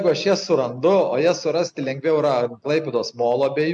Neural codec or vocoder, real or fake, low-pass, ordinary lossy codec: none; real; 9.9 kHz; MP3, 64 kbps